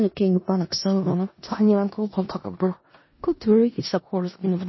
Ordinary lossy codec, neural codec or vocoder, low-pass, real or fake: MP3, 24 kbps; codec, 16 kHz in and 24 kHz out, 0.4 kbps, LongCat-Audio-Codec, four codebook decoder; 7.2 kHz; fake